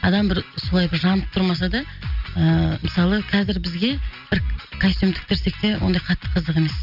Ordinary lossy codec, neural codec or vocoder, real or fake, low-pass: none; none; real; 5.4 kHz